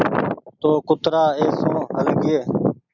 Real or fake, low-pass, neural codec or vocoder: real; 7.2 kHz; none